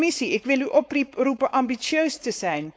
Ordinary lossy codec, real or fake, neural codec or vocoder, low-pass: none; fake; codec, 16 kHz, 4.8 kbps, FACodec; none